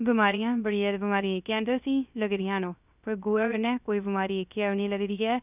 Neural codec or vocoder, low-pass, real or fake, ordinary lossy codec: codec, 16 kHz, 0.3 kbps, FocalCodec; 3.6 kHz; fake; none